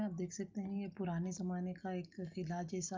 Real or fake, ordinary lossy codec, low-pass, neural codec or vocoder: real; Opus, 24 kbps; 7.2 kHz; none